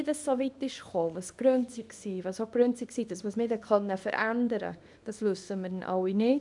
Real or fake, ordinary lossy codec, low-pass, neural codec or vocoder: fake; none; 10.8 kHz; codec, 24 kHz, 0.9 kbps, WavTokenizer, small release